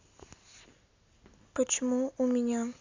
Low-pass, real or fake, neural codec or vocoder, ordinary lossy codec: 7.2 kHz; real; none; AAC, 48 kbps